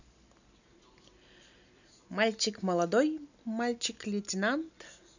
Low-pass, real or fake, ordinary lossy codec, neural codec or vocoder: 7.2 kHz; real; none; none